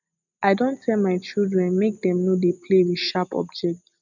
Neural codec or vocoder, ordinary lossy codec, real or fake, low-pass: none; none; real; 7.2 kHz